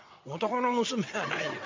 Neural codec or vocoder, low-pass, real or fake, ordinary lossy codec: vocoder, 22.05 kHz, 80 mel bands, WaveNeXt; 7.2 kHz; fake; MP3, 64 kbps